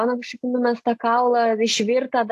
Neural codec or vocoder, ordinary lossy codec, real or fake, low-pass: none; AAC, 64 kbps; real; 14.4 kHz